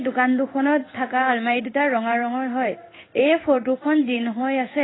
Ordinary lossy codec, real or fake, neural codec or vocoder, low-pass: AAC, 16 kbps; fake; codec, 16 kHz in and 24 kHz out, 1 kbps, XY-Tokenizer; 7.2 kHz